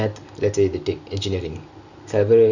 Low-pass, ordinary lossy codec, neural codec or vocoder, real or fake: 7.2 kHz; none; none; real